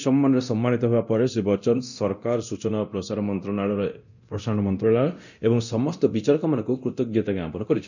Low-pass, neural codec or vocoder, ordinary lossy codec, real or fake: 7.2 kHz; codec, 24 kHz, 0.9 kbps, DualCodec; none; fake